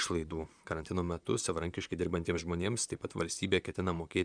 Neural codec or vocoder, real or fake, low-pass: vocoder, 44.1 kHz, 128 mel bands, Pupu-Vocoder; fake; 9.9 kHz